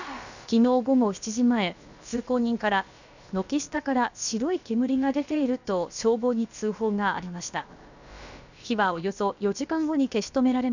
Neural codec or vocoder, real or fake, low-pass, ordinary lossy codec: codec, 16 kHz, about 1 kbps, DyCAST, with the encoder's durations; fake; 7.2 kHz; none